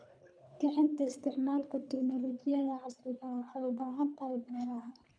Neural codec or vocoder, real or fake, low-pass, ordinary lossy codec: codec, 24 kHz, 3 kbps, HILCodec; fake; 9.9 kHz; none